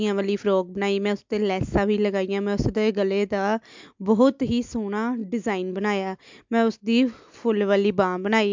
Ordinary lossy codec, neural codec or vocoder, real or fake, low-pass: MP3, 64 kbps; none; real; 7.2 kHz